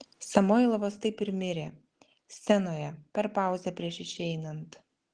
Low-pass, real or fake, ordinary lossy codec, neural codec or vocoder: 9.9 kHz; real; Opus, 16 kbps; none